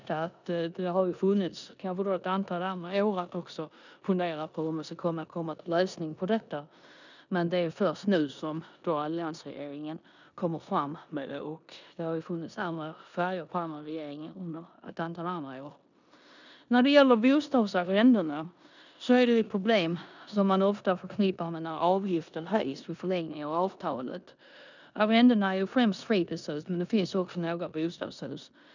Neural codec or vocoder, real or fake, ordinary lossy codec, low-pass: codec, 16 kHz in and 24 kHz out, 0.9 kbps, LongCat-Audio-Codec, four codebook decoder; fake; none; 7.2 kHz